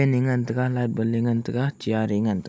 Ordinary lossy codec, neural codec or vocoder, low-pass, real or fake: none; none; none; real